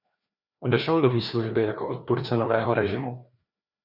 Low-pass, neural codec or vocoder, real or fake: 5.4 kHz; codec, 16 kHz, 2 kbps, FreqCodec, larger model; fake